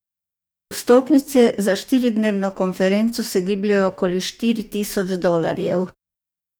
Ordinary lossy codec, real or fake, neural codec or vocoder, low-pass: none; fake; codec, 44.1 kHz, 2.6 kbps, DAC; none